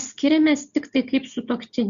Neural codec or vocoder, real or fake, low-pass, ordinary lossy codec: none; real; 7.2 kHz; Opus, 64 kbps